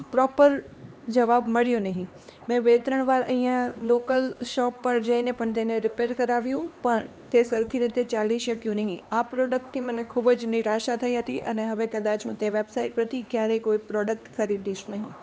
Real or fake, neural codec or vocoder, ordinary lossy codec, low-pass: fake; codec, 16 kHz, 2 kbps, X-Codec, HuBERT features, trained on LibriSpeech; none; none